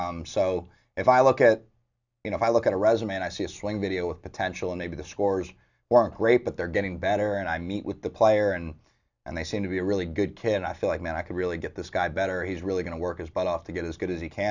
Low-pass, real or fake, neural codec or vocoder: 7.2 kHz; real; none